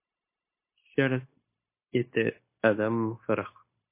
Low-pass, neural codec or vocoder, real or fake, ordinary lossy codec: 3.6 kHz; codec, 16 kHz, 0.9 kbps, LongCat-Audio-Codec; fake; MP3, 24 kbps